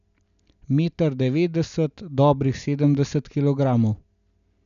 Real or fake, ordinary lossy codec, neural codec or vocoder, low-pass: real; none; none; 7.2 kHz